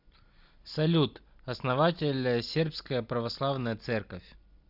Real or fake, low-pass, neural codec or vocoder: real; 5.4 kHz; none